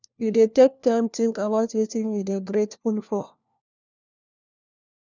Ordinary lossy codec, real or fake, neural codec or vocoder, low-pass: none; fake; codec, 16 kHz, 1 kbps, FunCodec, trained on LibriTTS, 50 frames a second; 7.2 kHz